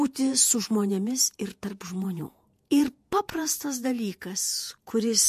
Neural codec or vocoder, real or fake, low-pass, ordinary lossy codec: vocoder, 48 kHz, 128 mel bands, Vocos; fake; 14.4 kHz; MP3, 64 kbps